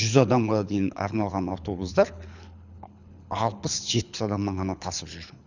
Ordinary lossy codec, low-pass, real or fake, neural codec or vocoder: none; 7.2 kHz; fake; codec, 24 kHz, 6 kbps, HILCodec